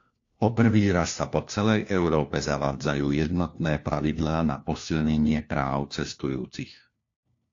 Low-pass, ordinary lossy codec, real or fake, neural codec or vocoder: 7.2 kHz; AAC, 48 kbps; fake; codec, 16 kHz, 1 kbps, FunCodec, trained on LibriTTS, 50 frames a second